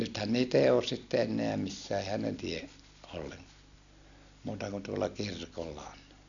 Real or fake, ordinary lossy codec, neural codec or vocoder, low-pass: real; none; none; 7.2 kHz